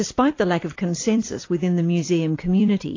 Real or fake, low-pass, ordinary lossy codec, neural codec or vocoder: fake; 7.2 kHz; AAC, 32 kbps; vocoder, 44.1 kHz, 128 mel bands every 256 samples, BigVGAN v2